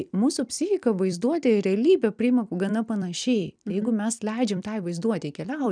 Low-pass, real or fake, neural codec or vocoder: 9.9 kHz; fake; vocoder, 44.1 kHz, 128 mel bands every 256 samples, BigVGAN v2